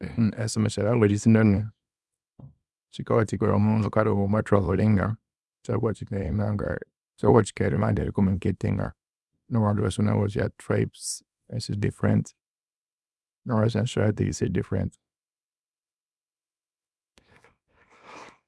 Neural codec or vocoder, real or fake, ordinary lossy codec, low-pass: codec, 24 kHz, 0.9 kbps, WavTokenizer, small release; fake; none; none